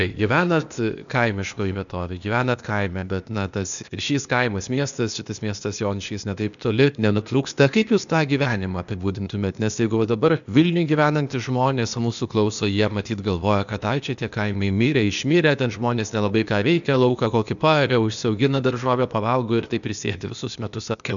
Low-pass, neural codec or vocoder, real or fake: 7.2 kHz; codec, 16 kHz, 0.8 kbps, ZipCodec; fake